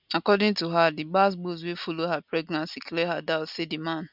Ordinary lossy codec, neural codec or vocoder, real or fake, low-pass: none; none; real; 5.4 kHz